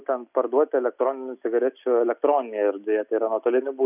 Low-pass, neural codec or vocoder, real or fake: 3.6 kHz; none; real